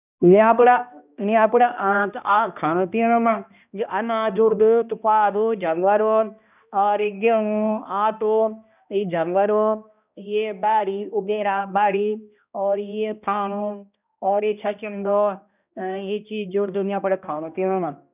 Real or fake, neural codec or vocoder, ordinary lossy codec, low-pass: fake; codec, 16 kHz, 1 kbps, X-Codec, HuBERT features, trained on balanced general audio; none; 3.6 kHz